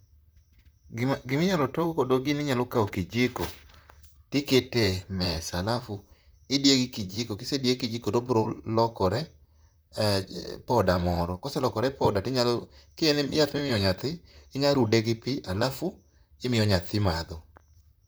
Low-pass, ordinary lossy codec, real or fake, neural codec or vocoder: none; none; fake; vocoder, 44.1 kHz, 128 mel bands, Pupu-Vocoder